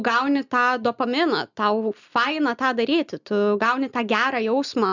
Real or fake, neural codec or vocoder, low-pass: real; none; 7.2 kHz